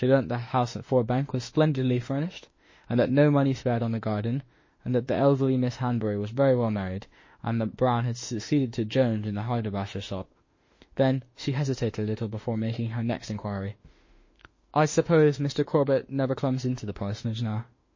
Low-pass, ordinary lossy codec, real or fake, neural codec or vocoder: 7.2 kHz; MP3, 32 kbps; fake; autoencoder, 48 kHz, 32 numbers a frame, DAC-VAE, trained on Japanese speech